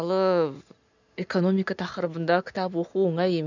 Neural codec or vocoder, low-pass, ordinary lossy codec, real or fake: none; 7.2 kHz; none; real